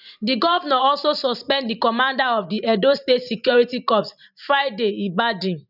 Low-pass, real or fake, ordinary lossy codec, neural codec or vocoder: 5.4 kHz; fake; none; vocoder, 44.1 kHz, 128 mel bands every 512 samples, BigVGAN v2